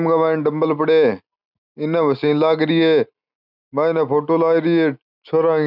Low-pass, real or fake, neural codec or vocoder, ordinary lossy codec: 5.4 kHz; real; none; none